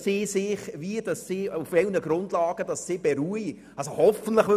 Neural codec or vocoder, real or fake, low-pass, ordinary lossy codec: none; real; 14.4 kHz; none